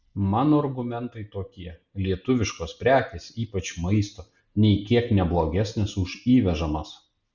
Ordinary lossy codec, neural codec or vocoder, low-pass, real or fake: Opus, 64 kbps; none; 7.2 kHz; real